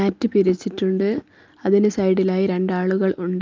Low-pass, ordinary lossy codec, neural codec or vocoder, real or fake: 7.2 kHz; Opus, 24 kbps; none; real